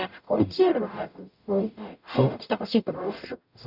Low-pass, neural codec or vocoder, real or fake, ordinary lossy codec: 5.4 kHz; codec, 44.1 kHz, 0.9 kbps, DAC; fake; none